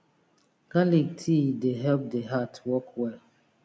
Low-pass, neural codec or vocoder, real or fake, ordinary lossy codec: none; none; real; none